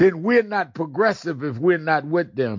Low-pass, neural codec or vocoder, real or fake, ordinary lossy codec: 7.2 kHz; none; real; MP3, 48 kbps